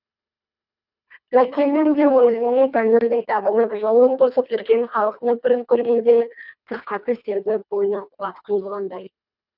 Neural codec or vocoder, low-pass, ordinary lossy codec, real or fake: codec, 24 kHz, 1.5 kbps, HILCodec; 5.4 kHz; none; fake